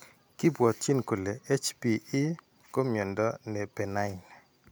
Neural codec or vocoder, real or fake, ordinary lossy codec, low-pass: none; real; none; none